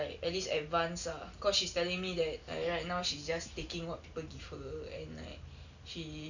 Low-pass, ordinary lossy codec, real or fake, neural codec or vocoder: 7.2 kHz; none; real; none